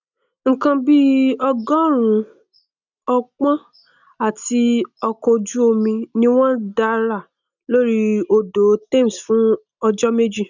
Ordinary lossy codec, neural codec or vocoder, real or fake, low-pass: none; none; real; 7.2 kHz